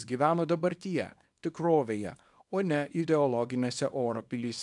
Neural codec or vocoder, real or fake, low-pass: codec, 24 kHz, 0.9 kbps, WavTokenizer, small release; fake; 10.8 kHz